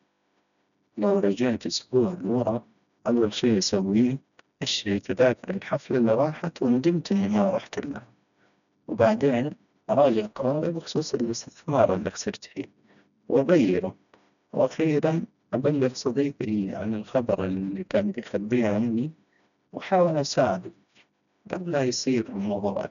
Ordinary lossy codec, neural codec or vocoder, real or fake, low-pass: none; codec, 16 kHz, 1 kbps, FreqCodec, smaller model; fake; 7.2 kHz